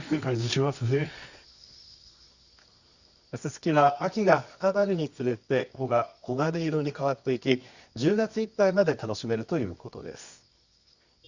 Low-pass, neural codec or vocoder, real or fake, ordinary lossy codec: 7.2 kHz; codec, 24 kHz, 0.9 kbps, WavTokenizer, medium music audio release; fake; Opus, 64 kbps